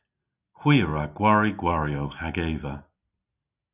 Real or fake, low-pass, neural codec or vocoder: real; 3.6 kHz; none